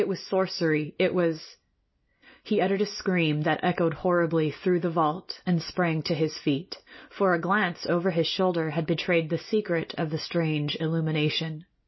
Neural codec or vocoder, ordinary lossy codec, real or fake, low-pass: none; MP3, 24 kbps; real; 7.2 kHz